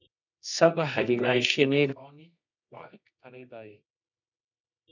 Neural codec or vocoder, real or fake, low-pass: codec, 24 kHz, 0.9 kbps, WavTokenizer, medium music audio release; fake; 7.2 kHz